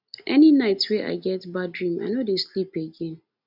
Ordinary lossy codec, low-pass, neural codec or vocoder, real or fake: none; 5.4 kHz; none; real